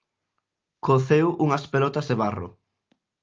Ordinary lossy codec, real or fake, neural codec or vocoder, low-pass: Opus, 24 kbps; real; none; 7.2 kHz